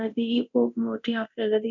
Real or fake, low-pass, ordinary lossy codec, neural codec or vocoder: fake; 7.2 kHz; none; codec, 24 kHz, 0.9 kbps, DualCodec